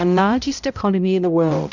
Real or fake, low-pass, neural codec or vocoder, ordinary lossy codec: fake; 7.2 kHz; codec, 16 kHz, 0.5 kbps, X-Codec, HuBERT features, trained on balanced general audio; Opus, 64 kbps